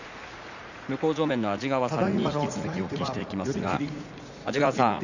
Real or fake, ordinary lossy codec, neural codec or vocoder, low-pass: fake; none; vocoder, 44.1 kHz, 80 mel bands, Vocos; 7.2 kHz